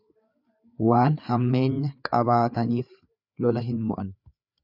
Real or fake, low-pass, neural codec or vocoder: fake; 5.4 kHz; codec, 16 kHz, 4 kbps, FreqCodec, larger model